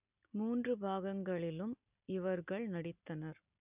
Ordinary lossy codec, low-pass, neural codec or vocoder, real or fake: none; 3.6 kHz; none; real